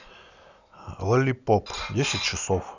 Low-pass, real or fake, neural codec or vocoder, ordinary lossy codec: 7.2 kHz; real; none; none